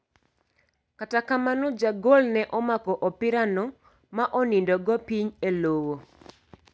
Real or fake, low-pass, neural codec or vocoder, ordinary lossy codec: real; none; none; none